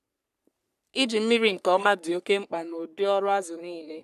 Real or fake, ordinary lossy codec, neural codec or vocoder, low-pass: fake; none; codec, 44.1 kHz, 3.4 kbps, Pupu-Codec; 14.4 kHz